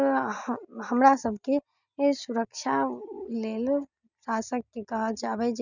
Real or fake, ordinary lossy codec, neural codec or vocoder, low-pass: real; none; none; 7.2 kHz